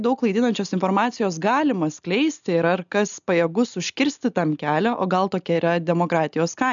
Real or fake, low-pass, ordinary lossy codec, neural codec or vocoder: real; 7.2 kHz; MP3, 96 kbps; none